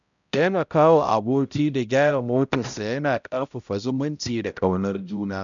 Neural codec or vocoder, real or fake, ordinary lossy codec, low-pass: codec, 16 kHz, 0.5 kbps, X-Codec, HuBERT features, trained on balanced general audio; fake; none; 7.2 kHz